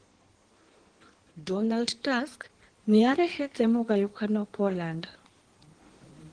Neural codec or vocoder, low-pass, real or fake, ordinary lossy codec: codec, 16 kHz in and 24 kHz out, 1.1 kbps, FireRedTTS-2 codec; 9.9 kHz; fake; Opus, 16 kbps